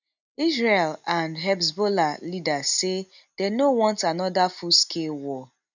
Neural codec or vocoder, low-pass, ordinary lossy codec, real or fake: none; 7.2 kHz; none; real